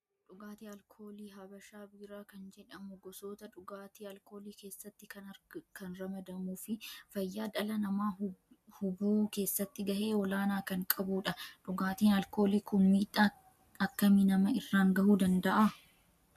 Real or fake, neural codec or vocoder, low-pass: real; none; 14.4 kHz